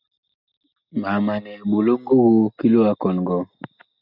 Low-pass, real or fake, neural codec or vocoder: 5.4 kHz; real; none